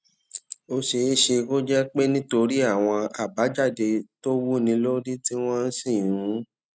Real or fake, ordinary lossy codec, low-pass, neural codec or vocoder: real; none; none; none